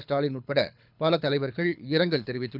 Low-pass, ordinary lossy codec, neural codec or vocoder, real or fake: 5.4 kHz; none; codec, 24 kHz, 6 kbps, HILCodec; fake